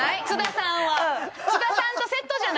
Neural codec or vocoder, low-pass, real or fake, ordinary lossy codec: none; none; real; none